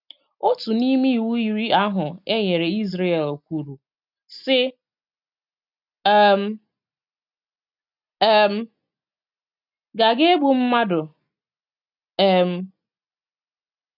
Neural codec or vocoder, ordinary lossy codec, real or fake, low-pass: none; none; real; 5.4 kHz